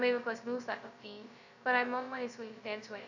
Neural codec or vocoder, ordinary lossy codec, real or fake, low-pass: codec, 16 kHz, 0.2 kbps, FocalCodec; none; fake; 7.2 kHz